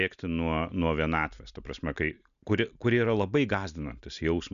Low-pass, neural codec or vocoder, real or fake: 7.2 kHz; none; real